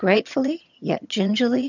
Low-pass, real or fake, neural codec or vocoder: 7.2 kHz; fake; vocoder, 22.05 kHz, 80 mel bands, HiFi-GAN